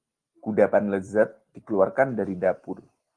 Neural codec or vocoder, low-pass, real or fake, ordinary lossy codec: none; 9.9 kHz; real; Opus, 24 kbps